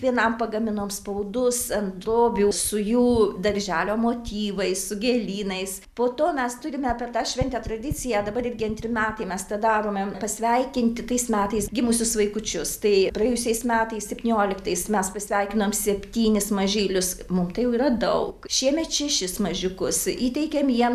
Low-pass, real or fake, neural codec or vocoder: 14.4 kHz; real; none